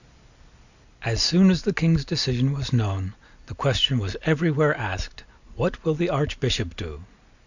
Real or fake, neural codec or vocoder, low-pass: real; none; 7.2 kHz